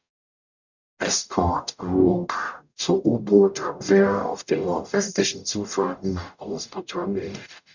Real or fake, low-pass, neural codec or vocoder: fake; 7.2 kHz; codec, 44.1 kHz, 0.9 kbps, DAC